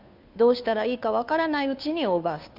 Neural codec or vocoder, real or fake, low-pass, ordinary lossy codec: codec, 16 kHz, 2 kbps, FunCodec, trained on Chinese and English, 25 frames a second; fake; 5.4 kHz; AAC, 48 kbps